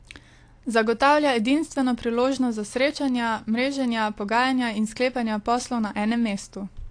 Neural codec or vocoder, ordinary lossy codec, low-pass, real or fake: none; AAC, 48 kbps; 9.9 kHz; real